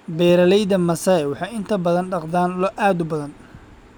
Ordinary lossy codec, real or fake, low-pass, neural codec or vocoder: none; real; none; none